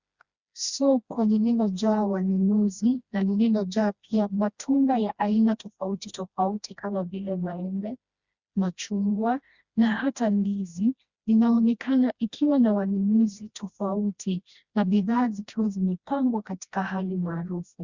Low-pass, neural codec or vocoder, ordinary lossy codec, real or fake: 7.2 kHz; codec, 16 kHz, 1 kbps, FreqCodec, smaller model; Opus, 64 kbps; fake